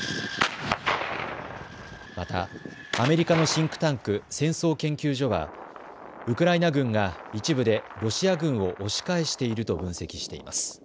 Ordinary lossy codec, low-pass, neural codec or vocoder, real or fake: none; none; none; real